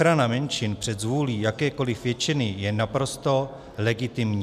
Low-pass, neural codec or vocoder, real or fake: 14.4 kHz; none; real